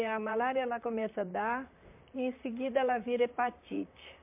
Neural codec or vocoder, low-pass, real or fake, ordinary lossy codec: vocoder, 44.1 kHz, 128 mel bands, Pupu-Vocoder; 3.6 kHz; fake; MP3, 32 kbps